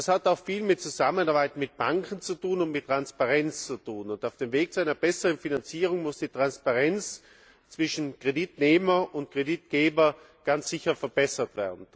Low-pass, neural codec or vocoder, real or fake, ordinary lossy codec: none; none; real; none